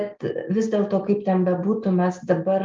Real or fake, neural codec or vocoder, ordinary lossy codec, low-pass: real; none; Opus, 24 kbps; 7.2 kHz